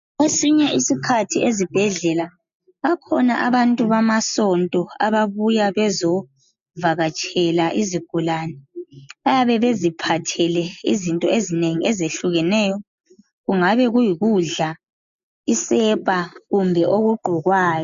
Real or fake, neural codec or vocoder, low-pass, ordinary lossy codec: real; none; 7.2 kHz; MP3, 64 kbps